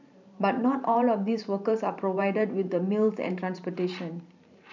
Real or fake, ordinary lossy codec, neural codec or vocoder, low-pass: fake; none; vocoder, 44.1 kHz, 128 mel bands every 512 samples, BigVGAN v2; 7.2 kHz